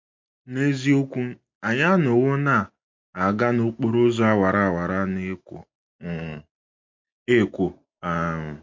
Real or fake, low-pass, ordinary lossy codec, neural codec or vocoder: real; 7.2 kHz; MP3, 48 kbps; none